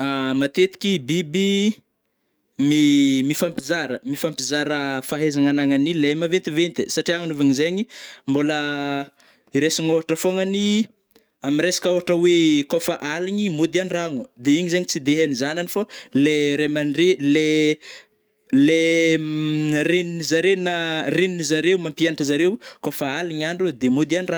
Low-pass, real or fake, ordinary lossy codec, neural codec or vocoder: none; fake; none; codec, 44.1 kHz, 7.8 kbps, DAC